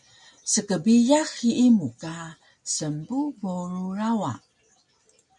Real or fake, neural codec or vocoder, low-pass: real; none; 10.8 kHz